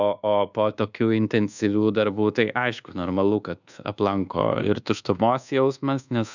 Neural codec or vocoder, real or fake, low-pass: codec, 24 kHz, 1.2 kbps, DualCodec; fake; 7.2 kHz